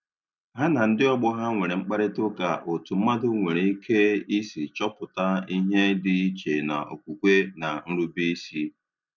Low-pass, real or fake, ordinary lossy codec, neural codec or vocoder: 7.2 kHz; real; none; none